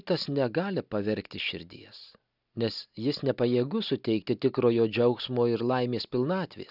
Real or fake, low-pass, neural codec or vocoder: real; 5.4 kHz; none